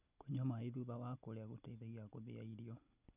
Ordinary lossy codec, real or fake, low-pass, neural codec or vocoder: none; real; 3.6 kHz; none